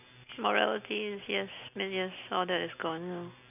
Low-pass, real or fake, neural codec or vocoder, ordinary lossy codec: 3.6 kHz; real; none; none